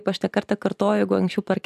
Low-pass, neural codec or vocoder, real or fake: 14.4 kHz; none; real